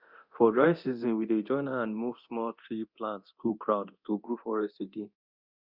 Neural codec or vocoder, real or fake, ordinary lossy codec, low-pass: codec, 24 kHz, 0.9 kbps, DualCodec; fake; Opus, 64 kbps; 5.4 kHz